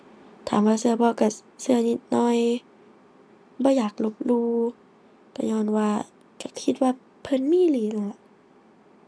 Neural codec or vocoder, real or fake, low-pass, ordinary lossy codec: none; real; none; none